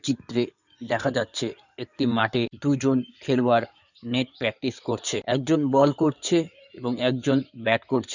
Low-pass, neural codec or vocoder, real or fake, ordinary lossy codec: 7.2 kHz; codec, 16 kHz in and 24 kHz out, 2.2 kbps, FireRedTTS-2 codec; fake; none